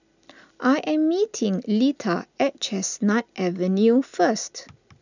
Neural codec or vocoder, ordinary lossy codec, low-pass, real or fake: none; none; 7.2 kHz; real